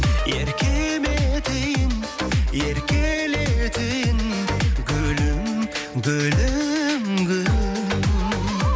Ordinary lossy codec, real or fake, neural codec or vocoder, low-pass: none; real; none; none